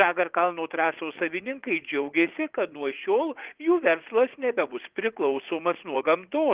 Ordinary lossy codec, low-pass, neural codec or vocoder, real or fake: Opus, 16 kbps; 3.6 kHz; vocoder, 44.1 kHz, 80 mel bands, Vocos; fake